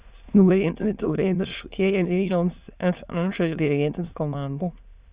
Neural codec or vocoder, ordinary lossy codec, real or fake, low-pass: autoencoder, 22.05 kHz, a latent of 192 numbers a frame, VITS, trained on many speakers; Opus, 64 kbps; fake; 3.6 kHz